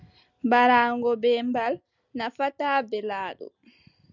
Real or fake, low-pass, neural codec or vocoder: real; 7.2 kHz; none